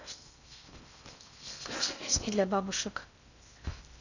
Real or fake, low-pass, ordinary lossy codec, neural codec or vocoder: fake; 7.2 kHz; none; codec, 16 kHz in and 24 kHz out, 0.6 kbps, FocalCodec, streaming, 4096 codes